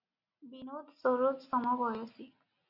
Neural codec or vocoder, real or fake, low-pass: none; real; 5.4 kHz